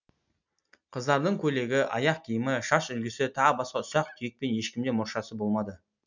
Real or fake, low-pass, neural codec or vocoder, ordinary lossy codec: real; 7.2 kHz; none; none